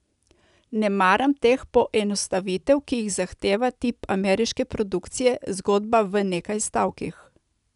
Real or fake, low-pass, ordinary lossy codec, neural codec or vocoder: real; 10.8 kHz; none; none